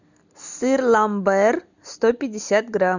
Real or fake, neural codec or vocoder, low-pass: real; none; 7.2 kHz